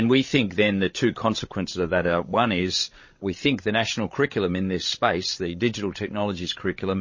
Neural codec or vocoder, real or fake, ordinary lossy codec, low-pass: codec, 16 kHz, 16 kbps, FreqCodec, larger model; fake; MP3, 32 kbps; 7.2 kHz